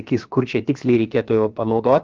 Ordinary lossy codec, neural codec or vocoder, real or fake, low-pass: Opus, 24 kbps; codec, 16 kHz, about 1 kbps, DyCAST, with the encoder's durations; fake; 7.2 kHz